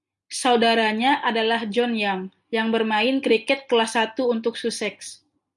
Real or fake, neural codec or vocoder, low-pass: real; none; 10.8 kHz